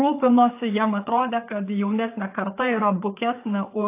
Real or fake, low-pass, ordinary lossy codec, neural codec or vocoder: fake; 3.6 kHz; AAC, 24 kbps; codec, 16 kHz, 4 kbps, FreqCodec, larger model